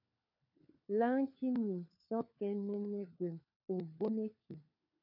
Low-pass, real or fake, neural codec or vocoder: 5.4 kHz; fake; codec, 16 kHz, 4 kbps, FunCodec, trained on LibriTTS, 50 frames a second